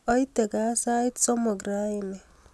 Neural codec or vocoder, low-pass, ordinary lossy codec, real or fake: none; none; none; real